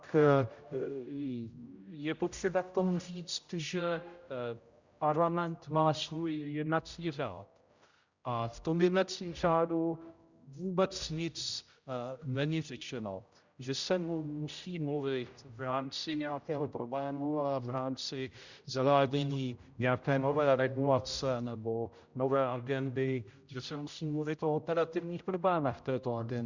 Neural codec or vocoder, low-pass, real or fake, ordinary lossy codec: codec, 16 kHz, 0.5 kbps, X-Codec, HuBERT features, trained on general audio; 7.2 kHz; fake; Opus, 64 kbps